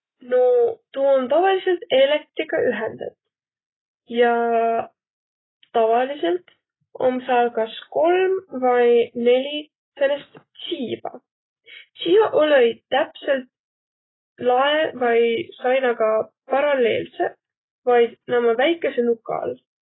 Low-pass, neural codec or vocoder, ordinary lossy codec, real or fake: 7.2 kHz; none; AAC, 16 kbps; real